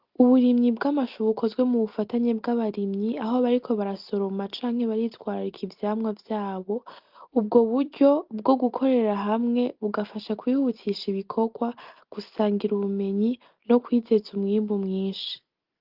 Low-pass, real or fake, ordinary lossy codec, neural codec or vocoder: 5.4 kHz; real; Opus, 32 kbps; none